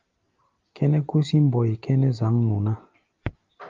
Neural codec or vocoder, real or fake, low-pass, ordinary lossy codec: none; real; 7.2 kHz; Opus, 32 kbps